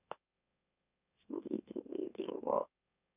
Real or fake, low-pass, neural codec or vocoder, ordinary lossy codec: fake; 3.6 kHz; autoencoder, 44.1 kHz, a latent of 192 numbers a frame, MeloTTS; none